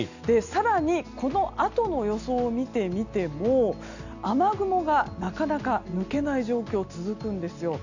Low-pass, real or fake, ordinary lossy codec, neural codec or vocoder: 7.2 kHz; real; none; none